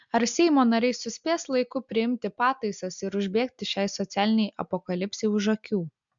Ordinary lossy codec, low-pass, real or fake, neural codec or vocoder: MP3, 64 kbps; 7.2 kHz; real; none